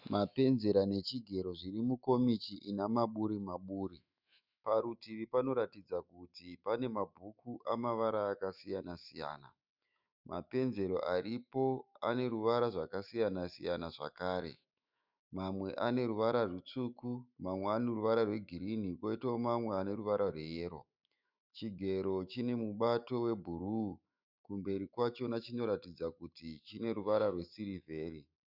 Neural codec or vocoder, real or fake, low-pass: autoencoder, 48 kHz, 128 numbers a frame, DAC-VAE, trained on Japanese speech; fake; 5.4 kHz